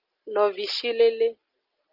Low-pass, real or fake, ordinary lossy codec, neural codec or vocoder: 5.4 kHz; real; Opus, 32 kbps; none